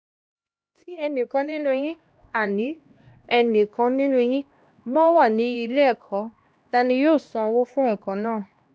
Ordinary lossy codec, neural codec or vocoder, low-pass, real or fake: none; codec, 16 kHz, 1 kbps, X-Codec, HuBERT features, trained on LibriSpeech; none; fake